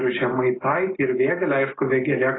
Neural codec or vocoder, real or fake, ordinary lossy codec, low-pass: none; real; AAC, 16 kbps; 7.2 kHz